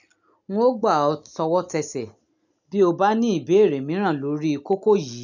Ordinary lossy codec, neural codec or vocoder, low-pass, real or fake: none; none; 7.2 kHz; real